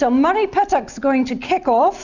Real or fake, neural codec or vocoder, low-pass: real; none; 7.2 kHz